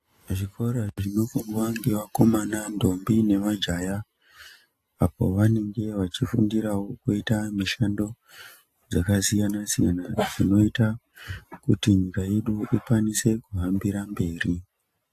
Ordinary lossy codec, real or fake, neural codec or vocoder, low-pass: AAC, 64 kbps; real; none; 14.4 kHz